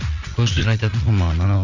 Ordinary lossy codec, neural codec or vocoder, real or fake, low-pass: none; vocoder, 44.1 kHz, 80 mel bands, Vocos; fake; 7.2 kHz